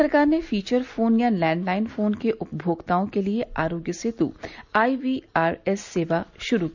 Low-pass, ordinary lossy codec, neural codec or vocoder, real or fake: 7.2 kHz; none; none; real